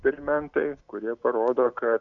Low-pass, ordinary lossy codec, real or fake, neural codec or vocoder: 7.2 kHz; MP3, 96 kbps; real; none